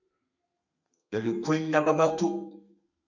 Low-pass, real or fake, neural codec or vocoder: 7.2 kHz; fake; codec, 32 kHz, 1.9 kbps, SNAC